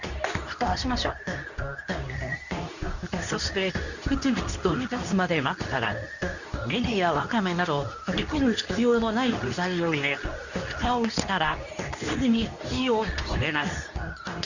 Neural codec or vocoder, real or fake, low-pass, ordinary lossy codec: codec, 24 kHz, 0.9 kbps, WavTokenizer, medium speech release version 2; fake; 7.2 kHz; none